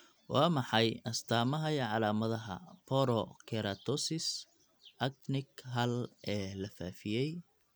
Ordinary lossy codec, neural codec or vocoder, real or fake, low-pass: none; none; real; none